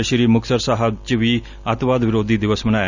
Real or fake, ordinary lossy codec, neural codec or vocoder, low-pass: real; none; none; 7.2 kHz